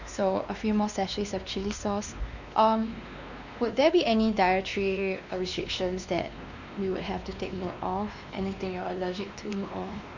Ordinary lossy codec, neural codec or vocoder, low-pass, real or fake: none; codec, 16 kHz, 2 kbps, X-Codec, WavLM features, trained on Multilingual LibriSpeech; 7.2 kHz; fake